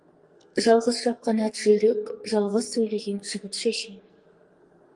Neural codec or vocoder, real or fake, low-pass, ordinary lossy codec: codec, 44.1 kHz, 2.6 kbps, SNAC; fake; 10.8 kHz; Opus, 32 kbps